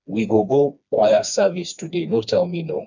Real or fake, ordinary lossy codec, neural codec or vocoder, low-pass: fake; none; codec, 16 kHz, 2 kbps, FreqCodec, smaller model; 7.2 kHz